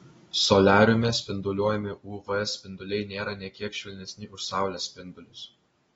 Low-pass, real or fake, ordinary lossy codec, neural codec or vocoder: 19.8 kHz; real; AAC, 24 kbps; none